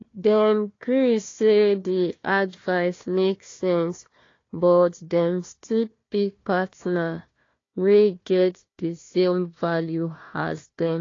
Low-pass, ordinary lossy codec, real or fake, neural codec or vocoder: 7.2 kHz; AAC, 32 kbps; fake; codec, 16 kHz, 1 kbps, FunCodec, trained on Chinese and English, 50 frames a second